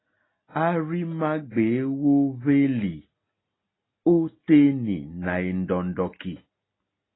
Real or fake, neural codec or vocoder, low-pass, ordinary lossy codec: real; none; 7.2 kHz; AAC, 16 kbps